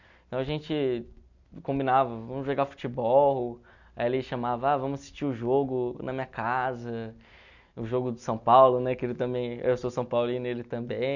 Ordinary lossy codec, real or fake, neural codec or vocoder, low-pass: none; real; none; 7.2 kHz